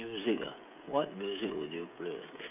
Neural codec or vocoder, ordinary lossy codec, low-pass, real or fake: codec, 16 kHz, 16 kbps, FreqCodec, smaller model; none; 3.6 kHz; fake